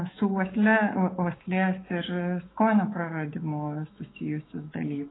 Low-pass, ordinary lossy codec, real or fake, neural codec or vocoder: 7.2 kHz; AAC, 16 kbps; fake; autoencoder, 48 kHz, 128 numbers a frame, DAC-VAE, trained on Japanese speech